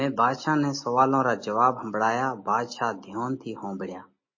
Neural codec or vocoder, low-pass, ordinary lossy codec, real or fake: none; 7.2 kHz; MP3, 32 kbps; real